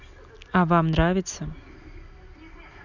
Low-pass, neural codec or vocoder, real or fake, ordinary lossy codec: 7.2 kHz; none; real; none